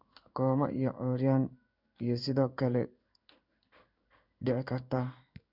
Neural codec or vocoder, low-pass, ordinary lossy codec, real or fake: codec, 16 kHz in and 24 kHz out, 1 kbps, XY-Tokenizer; 5.4 kHz; none; fake